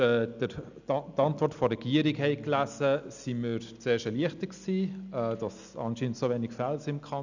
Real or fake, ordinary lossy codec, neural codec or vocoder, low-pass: real; none; none; 7.2 kHz